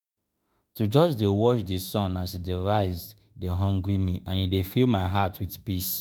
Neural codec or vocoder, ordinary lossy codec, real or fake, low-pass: autoencoder, 48 kHz, 32 numbers a frame, DAC-VAE, trained on Japanese speech; none; fake; none